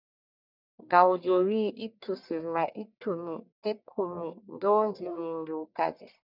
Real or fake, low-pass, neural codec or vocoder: fake; 5.4 kHz; codec, 44.1 kHz, 1.7 kbps, Pupu-Codec